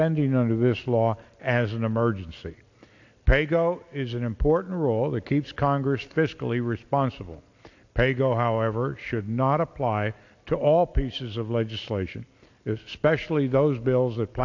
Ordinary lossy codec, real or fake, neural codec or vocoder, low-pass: AAC, 48 kbps; real; none; 7.2 kHz